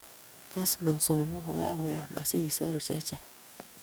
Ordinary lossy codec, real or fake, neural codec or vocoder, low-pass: none; fake; codec, 44.1 kHz, 2.6 kbps, DAC; none